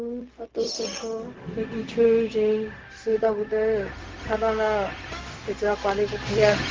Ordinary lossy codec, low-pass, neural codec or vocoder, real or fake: Opus, 16 kbps; 7.2 kHz; codec, 16 kHz, 0.4 kbps, LongCat-Audio-Codec; fake